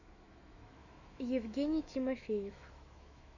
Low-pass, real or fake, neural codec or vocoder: 7.2 kHz; fake; autoencoder, 48 kHz, 128 numbers a frame, DAC-VAE, trained on Japanese speech